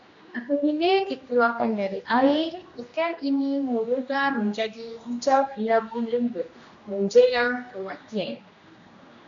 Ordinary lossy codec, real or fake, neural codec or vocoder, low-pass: AAC, 64 kbps; fake; codec, 16 kHz, 1 kbps, X-Codec, HuBERT features, trained on general audio; 7.2 kHz